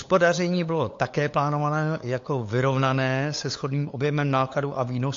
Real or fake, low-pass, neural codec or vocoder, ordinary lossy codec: fake; 7.2 kHz; codec, 16 kHz, 8 kbps, FunCodec, trained on LibriTTS, 25 frames a second; AAC, 48 kbps